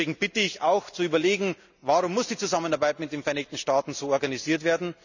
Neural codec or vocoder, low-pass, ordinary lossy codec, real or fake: none; 7.2 kHz; none; real